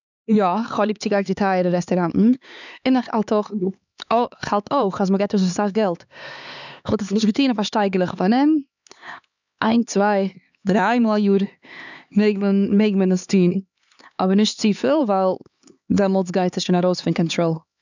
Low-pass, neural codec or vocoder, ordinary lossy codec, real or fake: 7.2 kHz; codec, 16 kHz, 4 kbps, X-Codec, HuBERT features, trained on LibriSpeech; none; fake